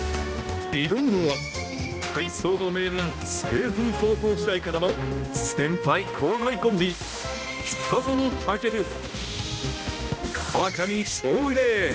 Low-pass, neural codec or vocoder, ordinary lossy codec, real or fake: none; codec, 16 kHz, 1 kbps, X-Codec, HuBERT features, trained on balanced general audio; none; fake